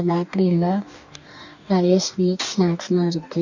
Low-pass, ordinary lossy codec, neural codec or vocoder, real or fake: 7.2 kHz; none; codec, 44.1 kHz, 2.6 kbps, DAC; fake